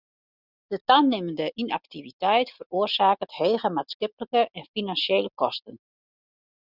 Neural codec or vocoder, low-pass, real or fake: none; 5.4 kHz; real